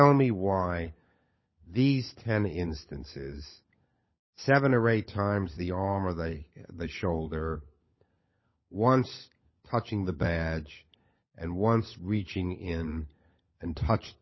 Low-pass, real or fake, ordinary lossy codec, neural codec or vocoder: 7.2 kHz; fake; MP3, 24 kbps; codec, 16 kHz, 16 kbps, FunCodec, trained on LibriTTS, 50 frames a second